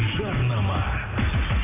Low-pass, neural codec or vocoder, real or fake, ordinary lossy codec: 3.6 kHz; none; real; AAC, 24 kbps